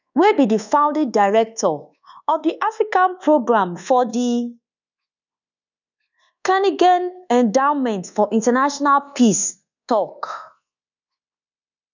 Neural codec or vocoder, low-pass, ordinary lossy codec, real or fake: codec, 24 kHz, 1.2 kbps, DualCodec; 7.2 kHz; none; fake